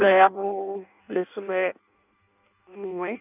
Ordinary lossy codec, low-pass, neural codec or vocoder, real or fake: none; 3.6 kHz; codec, 16 kHz in and 24 kHz out, 0.6 kbps, FireRedTTS-2 codec; fake